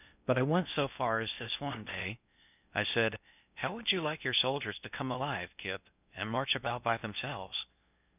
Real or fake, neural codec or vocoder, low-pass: fake; codec, 16 kHz in and 24 kHz out, 0.6 kbps, FocalCodec, streaming, 2048 codes; 3.6 kHz